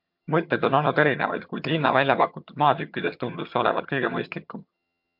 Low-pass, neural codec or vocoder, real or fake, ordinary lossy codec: 5.4 kHz; vocoder, 22.05 kHz, 80 mel bands, HiFi-GAN; fake; MP3, 48 kbps